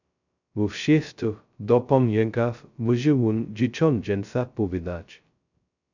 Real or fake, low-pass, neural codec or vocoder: fake; 7.2 kHz; codec, 16 kHz, 0.2 kbps, FocalCodec